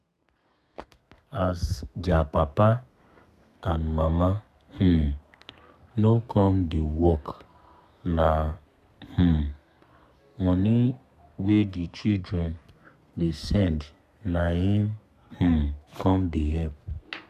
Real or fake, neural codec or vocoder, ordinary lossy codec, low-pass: fake; codec, 32 kHz, 1.9 kbps, SNAC; none; 14.4 kHz